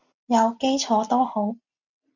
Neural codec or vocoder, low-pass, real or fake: none; 7.2 kHz; real